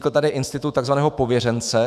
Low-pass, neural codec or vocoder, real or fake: 14.4 kHz; autoencoder, 48 kHz, 128 numbers a frame, DAC-VAE, trained on Japanese speech; fake